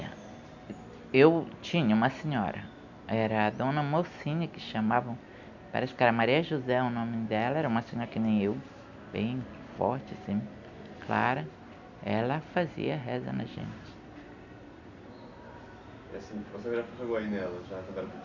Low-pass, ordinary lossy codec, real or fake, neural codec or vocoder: 7.2 kHz; none; real; none